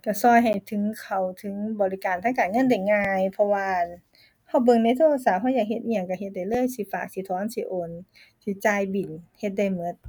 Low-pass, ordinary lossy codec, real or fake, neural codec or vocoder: 19.8 kHz; none; real; none